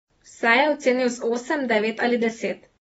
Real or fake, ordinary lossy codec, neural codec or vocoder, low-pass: real; AAC, 24 kbps; none; 19.8 kHz